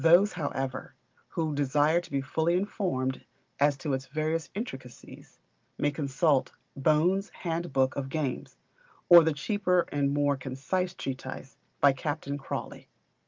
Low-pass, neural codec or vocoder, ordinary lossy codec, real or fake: 7.2 kHz; none; Opus, 32 kbps; real